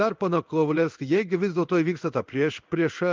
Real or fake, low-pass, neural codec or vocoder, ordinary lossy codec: fake; 7.2 kHz; codec, 16 kHz in and 24 kHz out, 1 kbps, XY-Tokenizer; Opus, 32 kbps